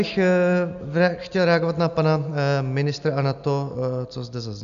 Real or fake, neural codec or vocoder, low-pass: real; none; 7.2 kHz